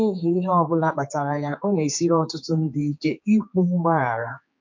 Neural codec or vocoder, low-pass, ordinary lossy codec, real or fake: codec, 16 kHz, 4 kbps, X-Codec, HuBERT features, trained on general audio; 7.2 kHz; MP3, 48 kbps; fake